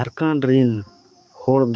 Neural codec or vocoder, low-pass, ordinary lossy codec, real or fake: codec, 16 kHz, 4 kbps, X-Codec, HuBERT features, trained on balanced general audio; none; none; fake